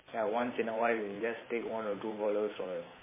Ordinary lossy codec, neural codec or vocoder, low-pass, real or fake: MP3, 16 kbps; vocoder, 44.1 kHz, 128 mel bands every 512 samples, BigVGAN v2; 3.6 kHz; fake